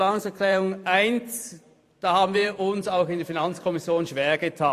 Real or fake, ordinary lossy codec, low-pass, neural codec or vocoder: fake; AAC, 64 kbps; 14.4 kHz; vocoder, 44.1 kHz, 128 mel bands every 512 samples, BigVGAN v2